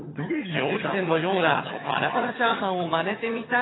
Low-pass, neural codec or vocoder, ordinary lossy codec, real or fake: 7.2 kHz; vocoder, 22.05 kHz, 80 mel bands, HiFi-GAN; AAC, 16 kbps; fake